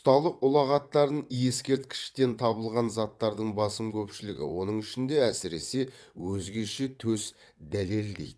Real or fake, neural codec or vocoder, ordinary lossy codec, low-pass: fake; vocoder, 22.05 kHz, 80 mel bands, WaveNeXt; none; none